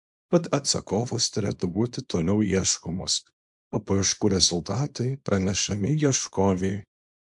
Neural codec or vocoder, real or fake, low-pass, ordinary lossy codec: codec, 24 kHz, 0.9 kbps, WavTokenizer, small release; fake; 10.8 kHz; MP3, 64 kbps